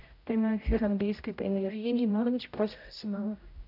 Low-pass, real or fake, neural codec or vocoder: 5.4 kHz; fake; codec, 16 kHz, 0.5 kbps, X-Codec, HuBERT features, trained on general audio